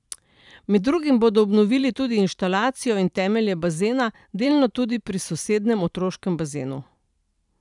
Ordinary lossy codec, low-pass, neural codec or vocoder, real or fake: none; 10.8 kHz; vocoder, 44.1 kHz, 128 mel bands every 512 samples, BigVGAN v2; fake